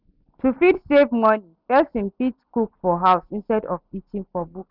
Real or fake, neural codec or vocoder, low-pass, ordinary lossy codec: real; none; 5.4 kHz; none